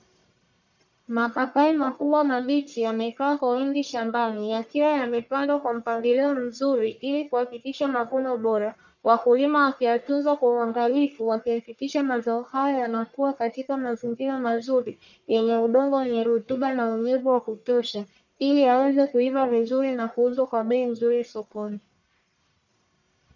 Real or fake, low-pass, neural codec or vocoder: fake; 7.2 kHz; codec, 44.1 kHz, 1.7 kbps, Pupu-Codec